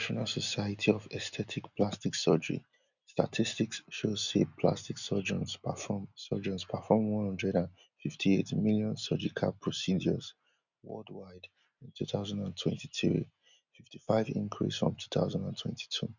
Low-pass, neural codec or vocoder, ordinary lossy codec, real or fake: 7.2 kHz; none; none; real